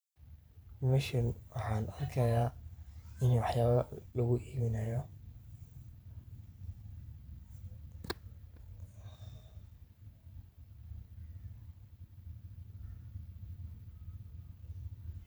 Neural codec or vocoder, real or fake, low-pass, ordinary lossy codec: vocoder, 44.1 kHz, 128 mel bands every 512 samples, BigVGAN v2; fake; none; none